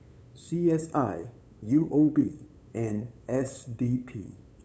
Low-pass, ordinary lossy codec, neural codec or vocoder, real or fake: none; none; codec, 16 kHz, 8 kbps, FunCodec, trained on LibriTTS, 25 frames a second; fake